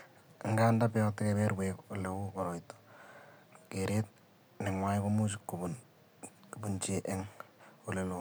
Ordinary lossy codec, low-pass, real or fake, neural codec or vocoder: none; none; real; none